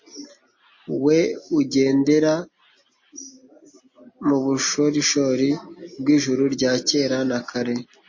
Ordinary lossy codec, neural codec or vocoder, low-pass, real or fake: MP3, 32 kbps; none; 7.2 kHz; real